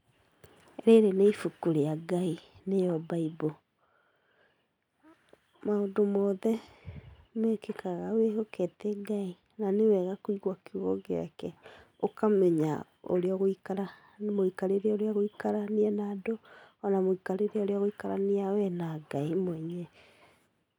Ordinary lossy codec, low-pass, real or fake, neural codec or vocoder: none; 19.8 kHz; real; none